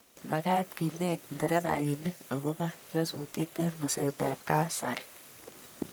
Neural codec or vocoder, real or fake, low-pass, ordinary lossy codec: codec, 44.1 kHz, 1.7 kbps, Pupu-Codec; fake; none; none